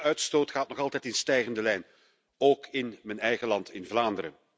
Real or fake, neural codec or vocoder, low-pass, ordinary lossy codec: real; none; none; none